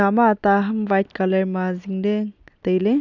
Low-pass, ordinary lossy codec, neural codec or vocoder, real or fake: 7.2 kHz; none; none; real